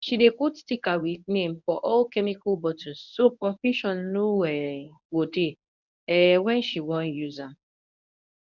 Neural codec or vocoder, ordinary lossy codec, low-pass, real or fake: codec, 24 kHz, 0.9 kbps, WavTokenizer, medium speech release version 1; none; 7.2 kHz; fake